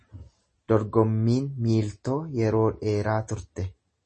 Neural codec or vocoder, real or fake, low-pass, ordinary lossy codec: none; real; 10.8 kHz; MP3, 32 kbps